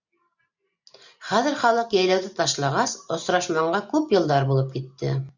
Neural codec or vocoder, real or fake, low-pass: none; real; 7.2 kHz